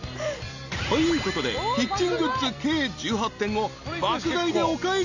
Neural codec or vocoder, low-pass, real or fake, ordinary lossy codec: none; 7.2 kHz; real; none